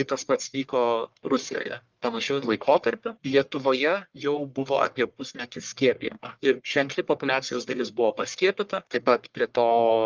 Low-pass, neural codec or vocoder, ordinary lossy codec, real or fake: 7.2 kHz; codec, 44.1 kHz, 1.7 kbps, Pupu-Codec; Opus, 24 kbps; fake